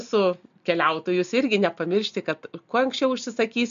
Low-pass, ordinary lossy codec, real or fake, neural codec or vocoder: 7.2 kHz; MP3, 64 kbps; real; none